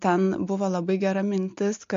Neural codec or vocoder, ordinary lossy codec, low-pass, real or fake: none; MP3, 48 kbps; 7.2 kHz; real